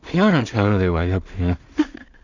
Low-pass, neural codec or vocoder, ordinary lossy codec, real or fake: 7.2 kHz; codec, 16 kHz in and 24 kHz out, 0.4 kbps, LongCat-Audio-Codec, two codebook decoder; none; fake